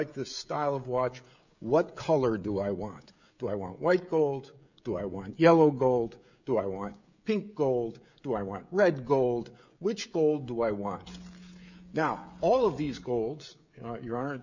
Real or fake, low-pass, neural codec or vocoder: fake; 7.2 kHz; codec, 16 kHz, 16 kbps, FreqCodec, smaller model